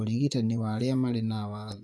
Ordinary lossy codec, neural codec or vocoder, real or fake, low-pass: none; vocoder, 24 kHz, 100 mel bands, Vocos; fake; none